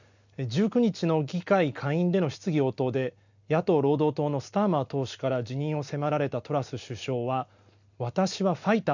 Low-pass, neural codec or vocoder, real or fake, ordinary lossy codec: 7.2 kHz; none; real; none